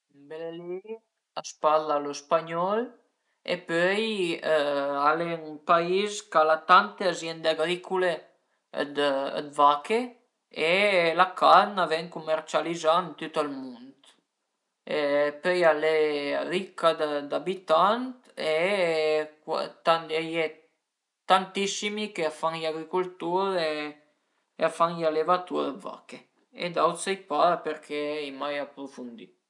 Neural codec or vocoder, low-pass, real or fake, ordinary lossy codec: none; 10.8 kHz; real; none